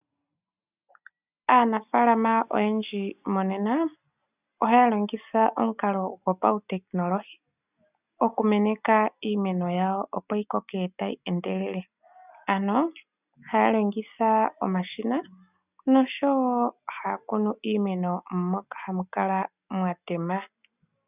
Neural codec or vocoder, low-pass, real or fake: none; 3.6 kHz; real